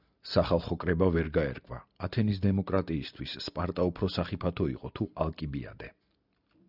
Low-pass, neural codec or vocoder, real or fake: 5.4 kHz; none; real